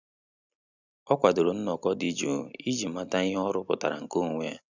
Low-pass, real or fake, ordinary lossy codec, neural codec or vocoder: 7.2 kHz; real; none; none